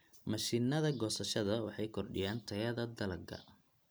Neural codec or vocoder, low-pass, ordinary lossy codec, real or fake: none; none; none; real